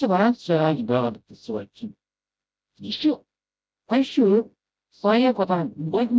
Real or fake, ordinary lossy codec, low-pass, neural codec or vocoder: fake; none; none; codec, 16 kHz, 0.5 kbps, FreqCodec, smaller model